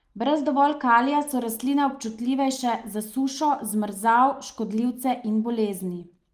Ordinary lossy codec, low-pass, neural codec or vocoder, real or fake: Opus, 24 kbps; 14.4 kHz; none; real